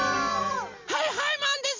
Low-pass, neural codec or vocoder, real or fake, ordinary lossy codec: 7.2 kHz; vocoder, 44.1 kHz, 128 mel bands every 256 samples, BigVGAN v2; fake; MP3, 48 kbps